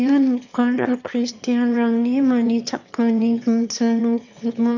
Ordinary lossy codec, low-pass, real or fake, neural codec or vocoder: none; 7.2 kHz; fake; autoencoder, 22.05 kHz, a latent of 192 numbers a frame, VITS, trained on one speaker